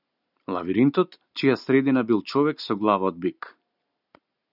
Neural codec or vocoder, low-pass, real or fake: none; 5.4 kHz; real